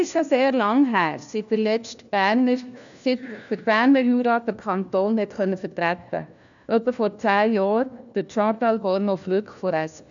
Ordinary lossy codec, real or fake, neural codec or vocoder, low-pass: none; fake; codec, 16 kHz, 1 kbps, FunCodec, trained on LibriTTS, 50 frames a second; 7.2 kHz